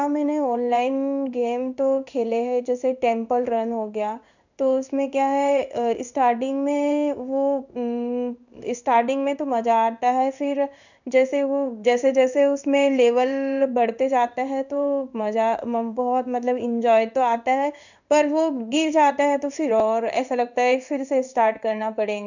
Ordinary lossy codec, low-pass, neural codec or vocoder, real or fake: none; 7.2 kHz; codec, 16 kHz in and 24 kHz out, 1 kbps, XY-Tokenizer; fake